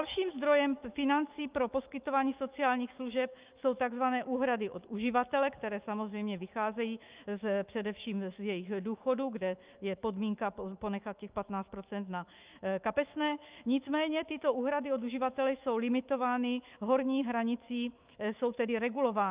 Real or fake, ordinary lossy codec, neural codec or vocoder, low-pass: fake; Opus, 24 kbps; autoencoder, 48 kHz, 128 numbers a frame, DAC-VAE, trained on Japanese speech; 3.6 kHz